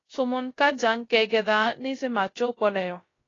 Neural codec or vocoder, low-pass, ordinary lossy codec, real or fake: codec, 16 kHz, 0.3 kbps, FocalCodec; 7.2 kHz; AAC, 32 kbps; fake